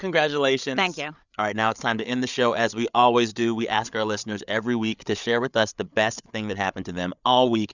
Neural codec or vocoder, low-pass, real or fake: codec, 16 kHz, 8 kbps, FreqCodec, larger model; 7.2 kHz; fake